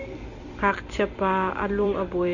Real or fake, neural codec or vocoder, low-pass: fake; vocoder, 44.1 kHz, 128 mel bands every 256 samples, BigVGAN v2; 7.2 kHz